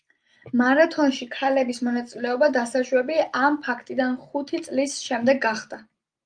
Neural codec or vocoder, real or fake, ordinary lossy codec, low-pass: none; real; Opus, 32 kbps; 9.9 kHz